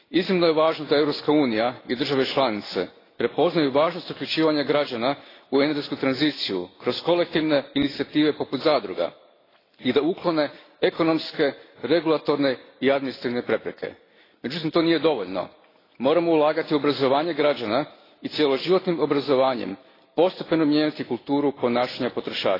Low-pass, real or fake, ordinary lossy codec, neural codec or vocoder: 5.4 kHz; real; AAC, 24 kbps; none